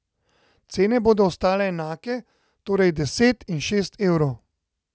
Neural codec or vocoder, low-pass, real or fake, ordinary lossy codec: none; none; real; none